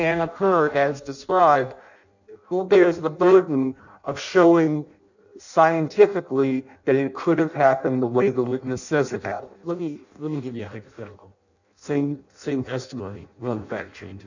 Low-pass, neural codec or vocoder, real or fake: 7.2 kHz; codec, 16 kHz in and 24 kHz out, 0.6 kbps, FireRedTTS-2 codec; fake